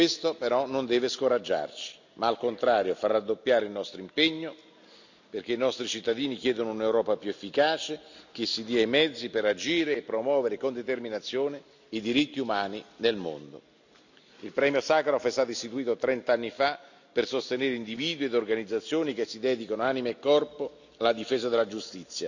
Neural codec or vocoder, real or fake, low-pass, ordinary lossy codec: none; real; 7.2 kHz; none